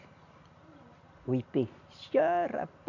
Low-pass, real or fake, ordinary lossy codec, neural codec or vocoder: 7.2 kHz; real; none; none